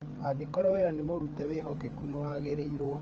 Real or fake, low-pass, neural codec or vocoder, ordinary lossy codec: fake; 7.2 kHz; codec, 16 kHz, 4 kbps, FreqCodec, larger model; Opus, 24 kbps